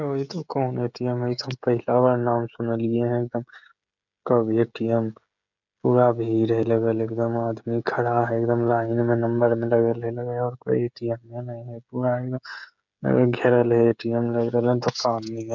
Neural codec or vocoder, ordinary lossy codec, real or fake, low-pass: codec, 16 kHz, 16 kbps, FreqCodec, smaller model; none; fake; 7.2 kHz